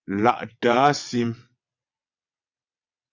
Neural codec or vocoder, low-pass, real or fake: vocoder, 22.05 kHz, 80 mel bands, WaveNeXt; 7.2 kHz; fake